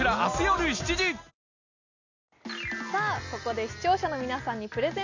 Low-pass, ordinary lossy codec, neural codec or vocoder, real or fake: 7.2 kHz; none; none; real